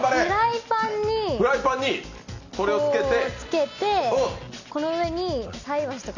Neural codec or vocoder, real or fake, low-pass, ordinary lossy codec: none; real; 7.2 kHz; none